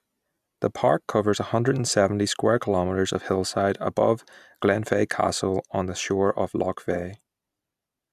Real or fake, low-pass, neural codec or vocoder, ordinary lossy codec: real; 14.4 kHz; none; none